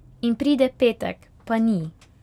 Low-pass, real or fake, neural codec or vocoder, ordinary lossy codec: 19.8 kHz; real; none; none